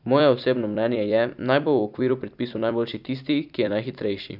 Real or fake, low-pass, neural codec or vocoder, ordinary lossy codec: fake; 5.4 kHz; vocoder, 44.1 kHz, 128 mel bands every 256 samples, BigVGAN v2; none